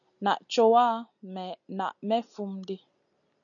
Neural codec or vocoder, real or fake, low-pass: none; real; 7.2 kHz